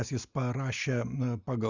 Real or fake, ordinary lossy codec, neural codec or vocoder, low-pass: real; Opus, 64 kbps; none; 7.2 kHz